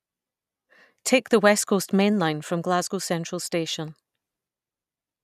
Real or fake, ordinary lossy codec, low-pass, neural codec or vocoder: real; none; 14.4 kHz; none